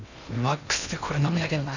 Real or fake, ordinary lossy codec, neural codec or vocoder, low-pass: fake; none; codec, 16 kHz in and 24 kHz out, 0.6 kbps, FocalCodec, streaming, 2048 codes; 7.2 kHz